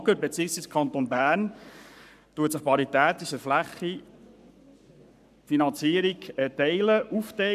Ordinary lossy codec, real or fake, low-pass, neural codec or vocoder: none; fake; 14.4 kHz; codec, 44.1 kHz, 7.8 kbps, Pupu-Codec